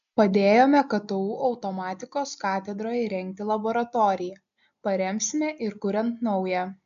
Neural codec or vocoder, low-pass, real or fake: none; 7.2 kHz; real